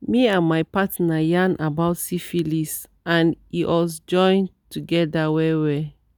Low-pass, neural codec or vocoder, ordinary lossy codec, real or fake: none; none; none; real